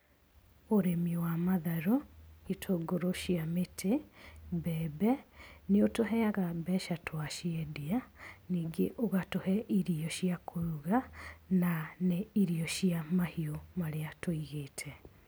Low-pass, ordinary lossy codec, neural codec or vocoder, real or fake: none; none; none; real